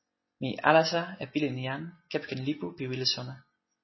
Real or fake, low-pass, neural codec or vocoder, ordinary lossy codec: real; 7.2 kHz; none; MP3, 24 kbps